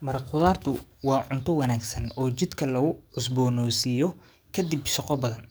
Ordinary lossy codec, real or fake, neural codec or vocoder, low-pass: none; fake; codec, 44.1 kHz, 7.8 kbps, DAC; none